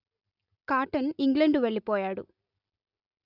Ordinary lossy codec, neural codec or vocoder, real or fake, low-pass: AAC, 48 kbps; none; real; 5.4 kHz